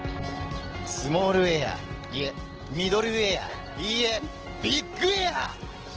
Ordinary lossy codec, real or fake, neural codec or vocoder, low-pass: Opus, 16 kbps; real; none; 7.2 kHz